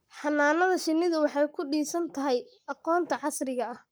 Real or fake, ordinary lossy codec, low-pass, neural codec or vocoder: fake; none; none; codec, 44.1 kHz, 7.8 kbps, Pupu-Codec